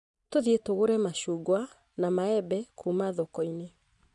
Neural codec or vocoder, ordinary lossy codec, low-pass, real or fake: none; none; 10.8 kHz; real